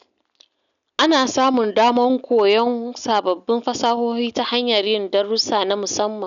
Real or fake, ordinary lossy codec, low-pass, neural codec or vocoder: real; none; 7.2 kHz; none